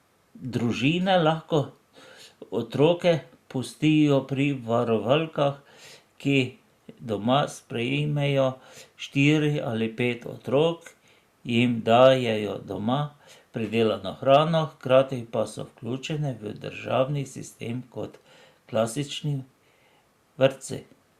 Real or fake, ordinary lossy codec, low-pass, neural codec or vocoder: real; Opus, 64 kbps; 14.4 kHz; none